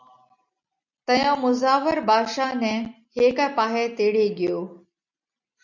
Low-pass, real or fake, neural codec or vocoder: 7.2 kHz; real; none